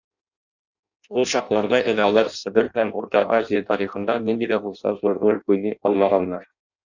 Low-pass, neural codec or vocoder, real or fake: 7.2 kHz; codec, 16 kHz in and 24 kHz out, 0.6 kbps, FireRedTTS-2 codec; fake